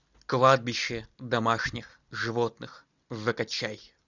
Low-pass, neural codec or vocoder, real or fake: 7.2 kHz; none; real